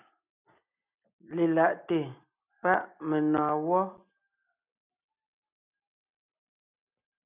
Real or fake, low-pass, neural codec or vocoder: real; 3.6 kHz; none